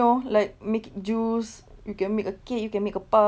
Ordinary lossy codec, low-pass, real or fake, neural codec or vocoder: none; none; real; none